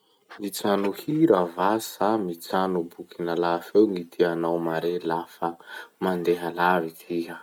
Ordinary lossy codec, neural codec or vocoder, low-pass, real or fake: none; none; 19.8 kHz; real